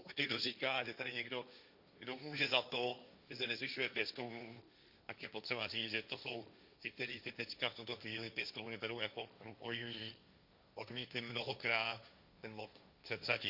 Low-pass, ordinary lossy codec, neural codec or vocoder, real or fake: 5.4 kHz; Opus, 64 kbps; codec, 16 kHz, 1.1 kbps, Voila-Tokenizer; fake